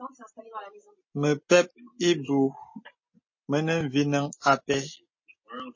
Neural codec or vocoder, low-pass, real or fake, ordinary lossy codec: none; 7.2 kHz; real; MP3, 32 kbps